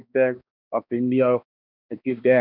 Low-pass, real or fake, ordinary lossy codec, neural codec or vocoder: 5.4 kHz; fake; none; codec, 16 kHz, 1 kbps, X-Codec, HuBERT features, trained on balanced general audio